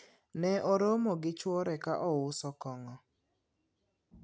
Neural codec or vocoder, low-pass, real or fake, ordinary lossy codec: none; none; real; none